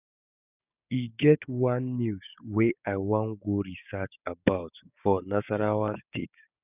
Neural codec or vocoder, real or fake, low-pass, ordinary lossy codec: codec, 16 kHz, 6 kbps, DAC; fake; 3.6 kHz; none